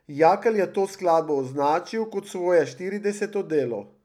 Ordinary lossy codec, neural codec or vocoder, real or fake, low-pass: none; none; real; 19.8 kHz